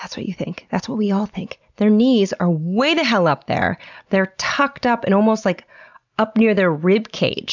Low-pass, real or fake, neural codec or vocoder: 7.2 kHz; real; none